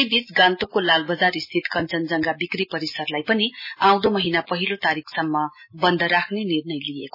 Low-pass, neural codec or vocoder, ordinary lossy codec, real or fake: 5.4 kHz; none; MP3, 24 kbps; real